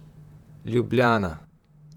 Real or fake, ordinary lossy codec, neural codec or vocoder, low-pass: fake; none; vocoder, 44.1 kHz, 128 mel bands, Pupu-Vocoder; 19.8 kHz